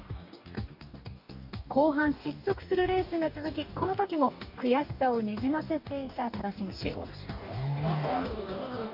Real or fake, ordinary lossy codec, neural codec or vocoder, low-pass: fake; none; codec, 44.1 kHz, 2.6 kbps, DAC; 5.4 kHz